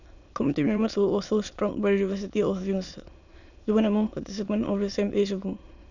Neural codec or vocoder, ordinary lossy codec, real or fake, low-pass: autoencoder, 22.05 kHz, a latent of 192 numbers a frame, VITS, trained on many speakers; none; fake; 7.2 kHz